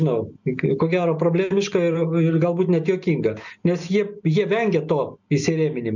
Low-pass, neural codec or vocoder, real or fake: 7.2 kHz; none; real